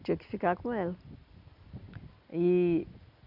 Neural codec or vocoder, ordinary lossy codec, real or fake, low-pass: none; none; real; 5.4 kHz